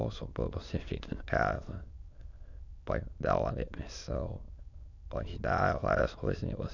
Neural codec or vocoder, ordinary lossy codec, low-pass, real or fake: autoencoder, 22.05 kHz, a latent of 192 numbers a frame, VITS, trained on many speakers; none; 7.2 kHz; fake